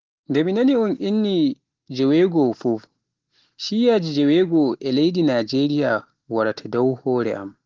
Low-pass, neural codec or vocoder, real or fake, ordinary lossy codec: 7.2 kHz; none; real; Opus, 16 kbps